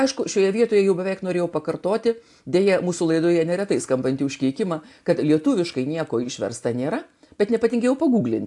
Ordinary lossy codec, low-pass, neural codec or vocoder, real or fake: AAC, 64 kbps; 10.8 kHz; none; real